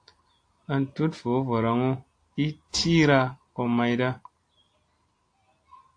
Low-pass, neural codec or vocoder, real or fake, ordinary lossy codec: 9.9 kHz; none; real; AAC, 48 kbps